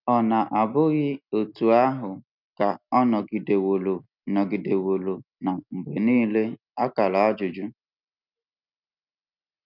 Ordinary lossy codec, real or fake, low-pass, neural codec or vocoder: none; real; 5.4 kHz; none